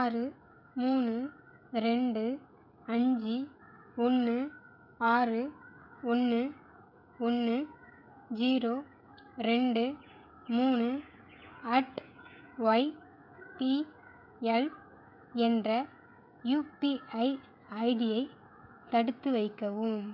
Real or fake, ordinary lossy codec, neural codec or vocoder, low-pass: fake; none; codec, 16 kHz, 16 kbps, FreqCodec, smaller model; 5.4 kHz